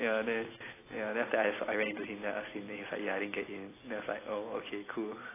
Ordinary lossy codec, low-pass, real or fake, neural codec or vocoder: AAC, 16 kbps; 3.6 kHz; real; none